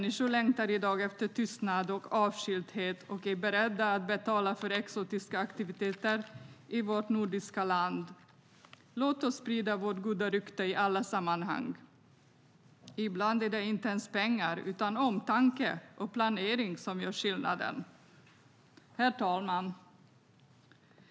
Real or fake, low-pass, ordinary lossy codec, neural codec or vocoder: real; none; none; none